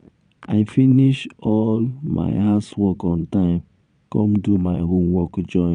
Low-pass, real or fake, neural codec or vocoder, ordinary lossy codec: 9.9 kHz; fake; vocoder, 22.05 kHz, 80 mel bands, Vocos; none